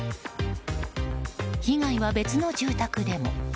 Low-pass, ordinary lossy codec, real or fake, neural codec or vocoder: none; none; real; none